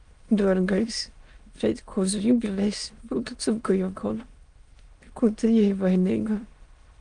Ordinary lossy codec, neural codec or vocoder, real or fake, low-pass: Opus, 32 kbps; autoencoder, 22.05 kHz, a latent of 192 numbers a frame, VITS, trained on many speakers; fake; 9.9 kHz